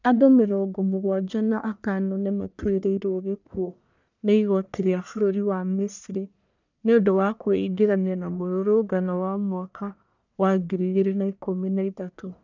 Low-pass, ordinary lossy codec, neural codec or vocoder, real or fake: 7.2 kHz; none; codec, 44.1 kHz, 1.7 kbps, Pupu-Codec; fake